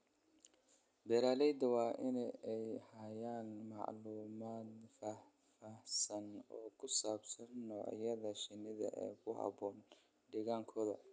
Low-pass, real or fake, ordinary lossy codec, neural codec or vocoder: none; real; none; none